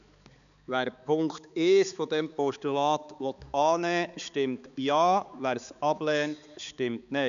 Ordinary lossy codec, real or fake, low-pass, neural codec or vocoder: none; fake; 7.2 kHz; codec, 16 kHz, 4 kbps, X-Codec, HuBERT features, trained on balanced general audio